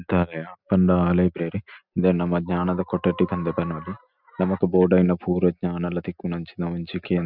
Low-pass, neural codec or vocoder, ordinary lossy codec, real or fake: 5.4 kHz; none; none; real